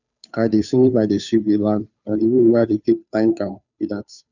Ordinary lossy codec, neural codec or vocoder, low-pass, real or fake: none; codec, 16 kHz, 2 kbps, FunCodec, trained on Chinese and English, 25 frames a second; 7.2 kHz; fake